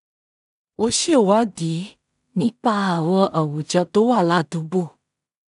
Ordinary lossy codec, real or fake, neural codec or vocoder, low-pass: none; fake; codec, 16 kHz in and 24 kHz out, 0.4 kbps, LongCat-Audio-Codec, two codebook decoder; 10.8 kHz